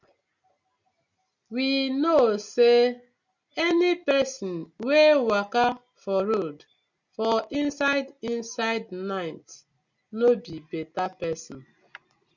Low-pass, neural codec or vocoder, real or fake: 7.2 kHz; none; real